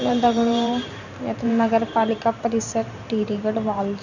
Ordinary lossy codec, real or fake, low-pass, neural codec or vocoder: none; fake; 7.2 kHz; vocoder, 44.1 kHz, 128 mel bands every 512 samples, BigVGAN v2